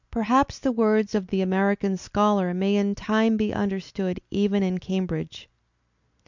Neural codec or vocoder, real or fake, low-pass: none; real; 7.2 kHz